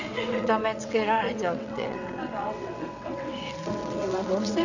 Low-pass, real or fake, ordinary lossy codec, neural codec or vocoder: 7.2 kHz; fake; none; vocoder, 22.05 kHz, 80 mel bands, WaveNeXt